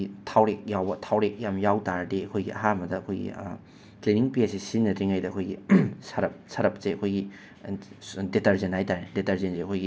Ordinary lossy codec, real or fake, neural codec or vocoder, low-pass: none; real; none; none